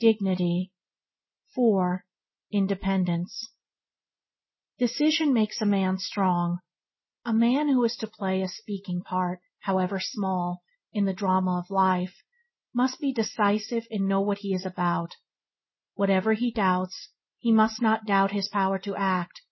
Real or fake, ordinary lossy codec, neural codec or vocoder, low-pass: real; MP3, 24 kbps; none; 7.2 kHz